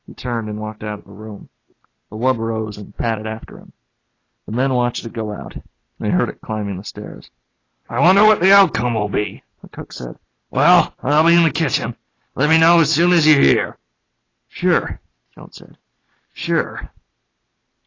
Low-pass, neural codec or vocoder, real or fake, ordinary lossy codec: 7.2 kHz; none; real; AAC, 32 kbps